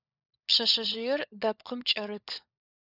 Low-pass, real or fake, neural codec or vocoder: 5.4 kHz; fake; codec, 16 kHz, 16 kbps, FunCodec, trained on LibriTTS, 50 frames a second